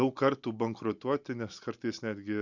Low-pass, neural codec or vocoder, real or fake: 7.2 kHz; none; real